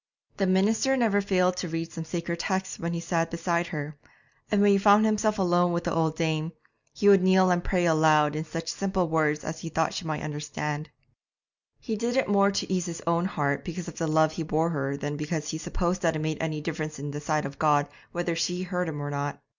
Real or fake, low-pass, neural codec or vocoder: real; 7.2 kHz; none